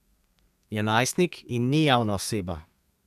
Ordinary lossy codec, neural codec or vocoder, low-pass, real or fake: none; codec, 32 kHz, 1.9 kbps, SNAC; 14.4 kHz; fake